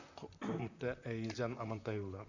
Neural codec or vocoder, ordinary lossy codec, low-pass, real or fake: vocoder, 44.1 kHz, 128 mel bands, Pupu-Vocoder; none; 7.2 kHz; fake